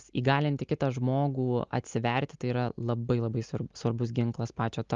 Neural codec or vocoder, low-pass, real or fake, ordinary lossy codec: none; 7.2 kHz; real; Opus, 32 kbps